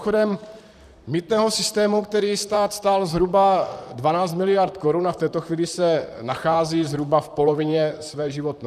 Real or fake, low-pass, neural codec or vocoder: fake; 14.4 kHz; vocoder, 44.1 kHz, 128 mel bands, Pupu-Vocoder